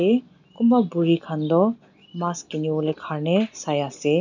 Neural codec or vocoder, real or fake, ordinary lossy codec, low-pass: none; real; none; 7.2 kHz